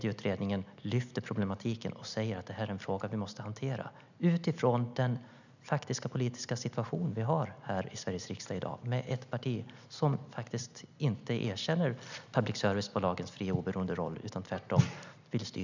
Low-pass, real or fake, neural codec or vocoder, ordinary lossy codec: 7.2 kHz; real; none; none